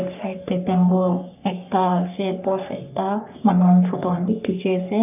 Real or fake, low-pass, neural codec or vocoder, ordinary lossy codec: fake; 3.6 kHz; codec, 44.1 kHz, 3.4 kbps, Pupu-Codec; none